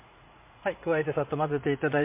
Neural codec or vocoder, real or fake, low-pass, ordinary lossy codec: vocoder, 44.1 kHz, 80 mel bands, Vocos; fake; 3.6 kHz; MP3, 24 kbps